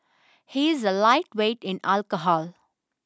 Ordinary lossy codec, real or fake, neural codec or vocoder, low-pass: none; real; none; none